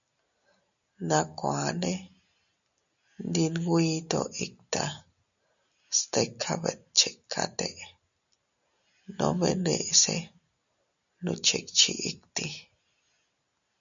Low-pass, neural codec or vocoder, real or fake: 7.2 kHz; none; real